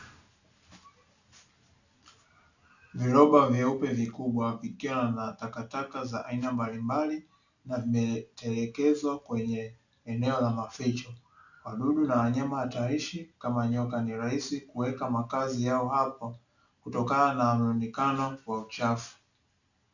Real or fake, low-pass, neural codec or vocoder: real; 7.2 kHz; none